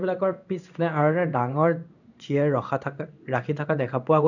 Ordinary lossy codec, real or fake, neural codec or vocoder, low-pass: none; fake; codec, 16 kHz in and 24 kHz out, 1 kbps, XY-Tokenizer; 7.2 kHz